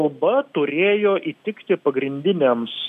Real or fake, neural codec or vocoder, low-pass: real; none; 14.4 kHz